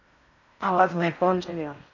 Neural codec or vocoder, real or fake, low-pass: codec, 16 kHz in and 24 kHz out, 0.6 kbps, FocalCodec, streaming, 4096 codes; fake; 7.2 kHz